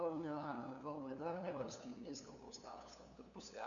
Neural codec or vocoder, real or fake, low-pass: codec, 16 kHz, 2 kbps, FunCodec, trained on LibriTTS, 25 frames a second; fake; 7.2 kHz